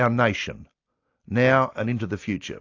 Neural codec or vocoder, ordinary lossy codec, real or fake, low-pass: none; AAC, 48 kbps; real; 7.2 kHz